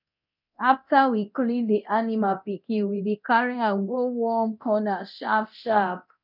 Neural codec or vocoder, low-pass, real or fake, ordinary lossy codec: codec, 24 kHz, 0.9 kbps, DualCodec; 5.4 kHz; fake; none